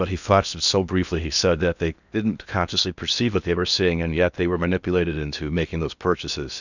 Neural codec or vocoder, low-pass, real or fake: codec, 16 kHz in and 24 kHz out, 0.8 kbps, FocalCodec, streaming, 65536 codes; 7.2 kHz; fake